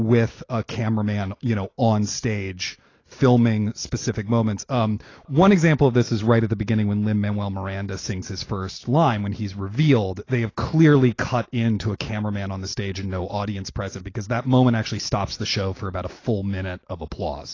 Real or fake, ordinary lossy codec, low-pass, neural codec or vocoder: real; AAC, 32 kbps; 7.2 kHz; none